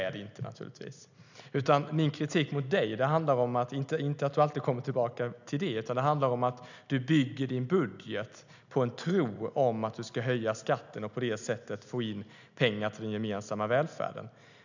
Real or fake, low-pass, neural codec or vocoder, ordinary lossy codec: real; 7.2 kHz; none; none